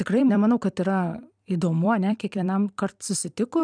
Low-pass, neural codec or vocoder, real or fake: 9.9 kHz; vocoder, 44.1 kHz, 128 mel bands every 256 samples, BigVGAN v2; fake